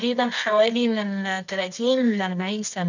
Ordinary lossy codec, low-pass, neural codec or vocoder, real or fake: none; 7.2 kHz; codec, 24 kHz, 0.9 kbps, WavTokenizer, medium music audio release; fake